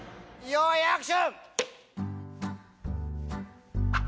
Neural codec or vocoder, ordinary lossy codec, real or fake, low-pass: none; none; real; none